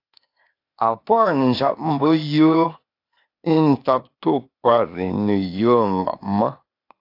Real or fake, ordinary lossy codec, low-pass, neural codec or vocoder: fake; AAC, 32 kbps; 5.4 kHz; codec, 16 kHz, 0.8 kbps, ZipCodec